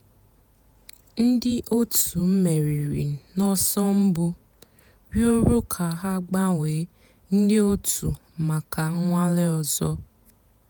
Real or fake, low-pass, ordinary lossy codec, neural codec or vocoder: fake; none; none; vocoder, 48 kHz, 128 mel bands, Vocos